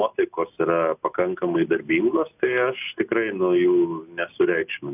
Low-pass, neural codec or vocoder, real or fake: 3.6 kHz; none; real